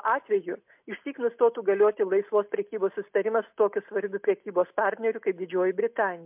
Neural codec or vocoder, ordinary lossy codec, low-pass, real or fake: none; MP3, 32 kbps; 3.6 kHz; real